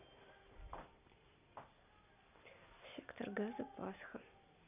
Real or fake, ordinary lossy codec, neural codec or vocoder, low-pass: real; none; none; 3.6 kHz